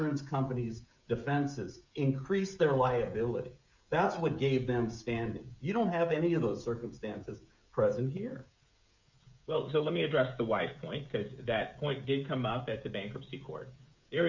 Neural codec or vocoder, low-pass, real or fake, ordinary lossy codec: codec, 16 kHz, 16 kbps, FreqCodec, smaller model; 7.2 kHz; fake; MP3, 64 kbps